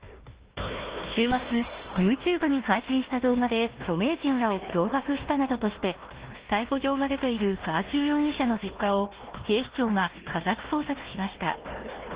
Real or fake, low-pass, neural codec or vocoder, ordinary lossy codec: fake; 3.6 kHz; codec, 16 kHz, 1 kbps, FunCodec, trained on Chinese and English, 50 frames a second; Opus, 16 kbps